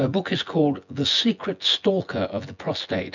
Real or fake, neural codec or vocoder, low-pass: fake; vocoder, 24 kHz, 100 mel bands, Vocos; 7.2 kHz